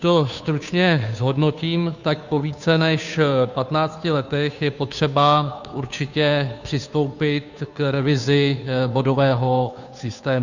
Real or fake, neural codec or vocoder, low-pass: fake; codec, 16 kHz, 2 kbps, FunCodec, trained on Chinese and English, 25 frames a second; 7.2 kHz